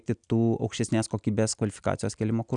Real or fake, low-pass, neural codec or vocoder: real; 9.9 kHz; none